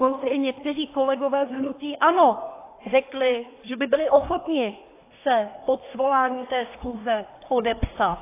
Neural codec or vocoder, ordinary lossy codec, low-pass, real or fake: codec, 24 kHz, 1 kbps, SNAC; AAC, 24 kbps; 3.6 kHz; fake